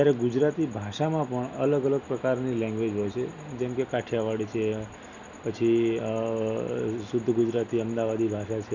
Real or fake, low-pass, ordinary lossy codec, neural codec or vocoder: real; 7.2 kHz; none; none